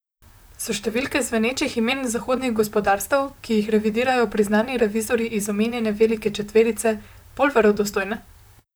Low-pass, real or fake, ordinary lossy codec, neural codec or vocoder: none; fake; none; vocoder, 44.1 kHz, 128 mel bands, Pupu-Vocoder